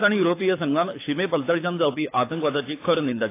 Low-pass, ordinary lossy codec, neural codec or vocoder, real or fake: 3.6 kHz; AAC, 24 kbps; codec, 24 kHz, 6 kbps, HILCodec; fake